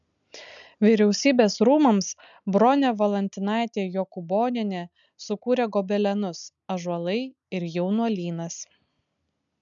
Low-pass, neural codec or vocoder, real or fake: 7.2 kHz; none; real